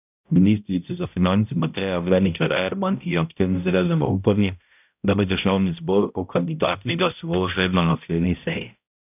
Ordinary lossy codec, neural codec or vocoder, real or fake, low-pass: none; codec, 16 kHz, 0.5 kbps, X-Codec, HuBERT features, trained on balanced general audio; fake; 3.6 kHz